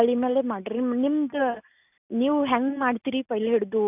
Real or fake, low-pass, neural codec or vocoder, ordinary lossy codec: real; 3.6 kHz; none; none